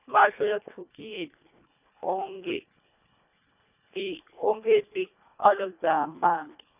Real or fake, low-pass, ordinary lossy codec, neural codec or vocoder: fake; 3.6 kHz; none; codec, 24 kHz, 1.5 kbps, HILCodec